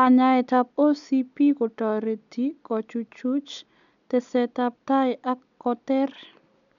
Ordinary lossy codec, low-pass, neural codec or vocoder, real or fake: none; 7.2 kHz; none; real